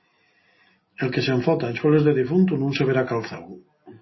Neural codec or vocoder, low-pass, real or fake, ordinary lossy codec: none; 7.2 kHz; real; MP3, 24 kbps